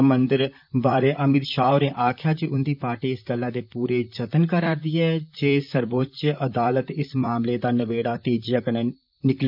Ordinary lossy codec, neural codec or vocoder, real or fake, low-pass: none; vocoder, 44.1 kHz, 128 mel bands, Pupu-Vocoder; fake; 5.4 kHz